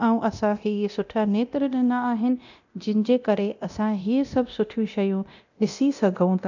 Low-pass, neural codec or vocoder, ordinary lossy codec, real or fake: 7.2 kHz; codec, 24 kHz, 0.9 kbps, DualCodec; none; fake